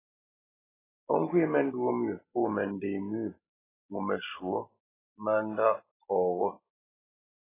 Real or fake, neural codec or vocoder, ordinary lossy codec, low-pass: real; none; AAC, 16 kbps; 3.6 kHz